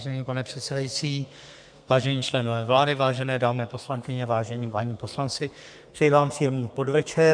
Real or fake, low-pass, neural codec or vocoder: fake; 9.9 kHz; codec, 32 kHz, 1.9 kbps, SNAC